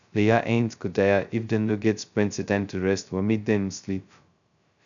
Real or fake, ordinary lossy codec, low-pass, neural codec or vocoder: fake; none; 7.2 kHz; codec, 16 kHz, 0.2 kbps, FocalCodec